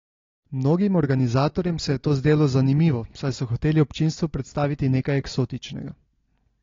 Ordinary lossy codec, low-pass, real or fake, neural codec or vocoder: AAC, 32 kbps; 7.2 kHz; real; none